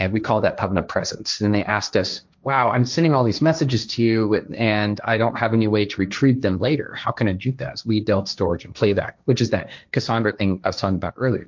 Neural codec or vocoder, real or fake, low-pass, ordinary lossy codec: codec, 16 kHz, 1.1 kbps, Voila-Tokenizer; fake; 7.2 kHz; MP3, 64 kbps